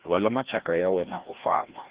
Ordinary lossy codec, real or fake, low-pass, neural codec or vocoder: Opus, 16 kbps; fake; 3.6 kHz; codec, 16 kHz, 1 kbps, FreqCodec, larger model